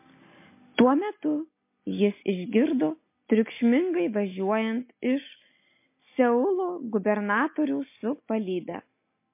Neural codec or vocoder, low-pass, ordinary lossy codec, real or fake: none; 3.6 kHz; MP3, 24 kbps; real